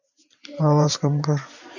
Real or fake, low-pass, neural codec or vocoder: fake; 7.2 kHz; vocoder, 44.1 kHz, 128 mel bands every 512 samples, BigVGAN v2